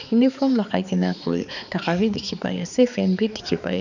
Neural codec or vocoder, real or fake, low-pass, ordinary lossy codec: codec, 16 kHz, 4 kbps, X-Codec, HuBERT features, trained on balanced general audio; fake; 7.2 kHz; none